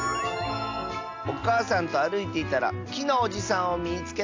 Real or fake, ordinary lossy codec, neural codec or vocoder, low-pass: real; AAC, 48 kbps; none; 7.2 kHz